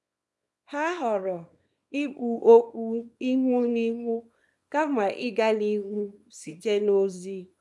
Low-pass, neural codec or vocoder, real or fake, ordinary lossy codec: none; codec, 24 kHz, 0.9 kbps, WavTokenizer, small release; fake; none